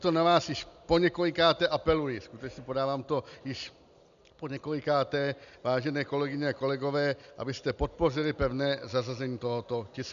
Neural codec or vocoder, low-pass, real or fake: none; 7.2 kHz; real